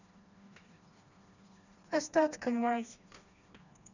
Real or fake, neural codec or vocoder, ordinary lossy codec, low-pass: fake; codec, 16 kHz, 2 kbps, FreqCodec, smaller model; none; 7.2 kHz